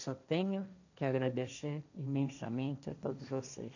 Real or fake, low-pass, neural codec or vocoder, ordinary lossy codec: fake; 7.2 kHz; codec, 16 kHz, 1.1 kbps, Voila-Tokenizer; none